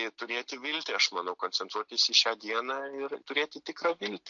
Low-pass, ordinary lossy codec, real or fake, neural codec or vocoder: 7.2 kHz; MP3, 48 kbps; real; none